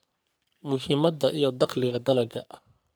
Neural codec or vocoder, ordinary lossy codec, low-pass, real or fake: codec, 44.1 kHz, 3.4 kbps, Pupu-Codec; none; none; fake